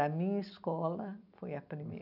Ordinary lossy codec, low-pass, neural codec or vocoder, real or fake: none; 5.4 kHz; none; real